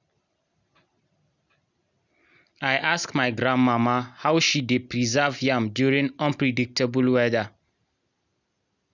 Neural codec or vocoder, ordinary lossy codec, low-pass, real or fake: none; none; 7.2 kHz; real